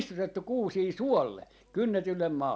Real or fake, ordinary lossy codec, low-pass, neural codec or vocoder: real; none; none; none